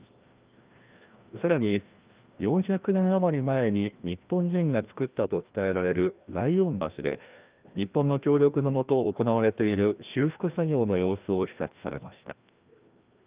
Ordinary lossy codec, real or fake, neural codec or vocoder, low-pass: Opus, 24 kbps; fake; codec, 16 kHz, 1 kbps, FreqCodec, larger model; 3.6 kHz